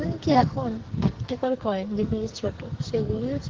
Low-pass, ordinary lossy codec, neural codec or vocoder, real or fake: 7.2 kHz; Opus, 16 kbps; codec, 44.1 kHz, 2.6 kbps, SNAC; fake